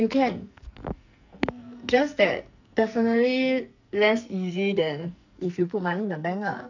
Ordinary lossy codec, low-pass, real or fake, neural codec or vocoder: none; 7.2 kHz; fake; codec, 44.1 kHz, 2.6 kbps, SNAC